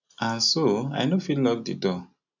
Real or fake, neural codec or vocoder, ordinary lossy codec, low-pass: real; none; none; 7.2 kHz